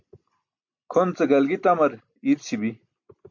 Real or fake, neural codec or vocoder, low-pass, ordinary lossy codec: real; none; 7.2 kHz; MP3, 64 kbps